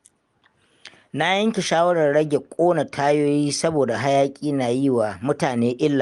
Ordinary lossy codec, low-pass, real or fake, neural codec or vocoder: Opus, 32 kbps; 10.8 kHz; real; none